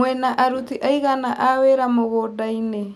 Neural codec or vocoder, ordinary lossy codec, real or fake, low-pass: none; none; real; 14.4 kHz